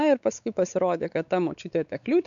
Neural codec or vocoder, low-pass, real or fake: codec, 16 kHz, 4 kbps, X-Codec, WavLM features, trained on Multilingual LibriSpeech; 7.2 kHz; fake